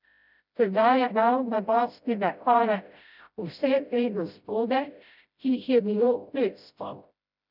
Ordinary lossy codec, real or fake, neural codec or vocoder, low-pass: none; fake; codec, 16 kHz, 0.5 kbps, FreqCodec, smaller model; 5.4 kHz